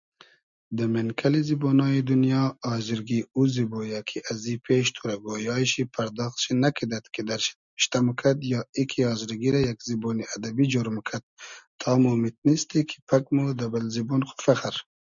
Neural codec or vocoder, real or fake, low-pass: none; real; 7.2 kHz